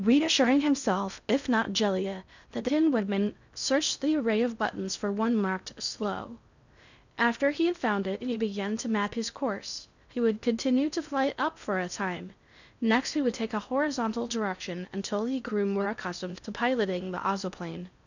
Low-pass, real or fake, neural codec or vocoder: 7.2 kHz; fake; codec, 16 kHz in and 24 kHz out, 0.6 kbps, FocalCodec, streaming, 4096 codes